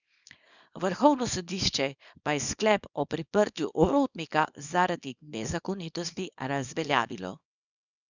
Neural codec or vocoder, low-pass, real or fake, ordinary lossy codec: codec, 24 kHz, 0.9 kbps, WavTokenizer, small release; 7.2 kHz; fake; none